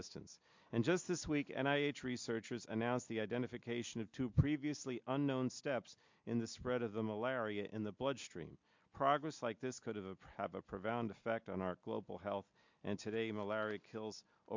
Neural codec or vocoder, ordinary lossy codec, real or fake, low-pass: none; MP3, 64 kbps; real; 7.2 kHz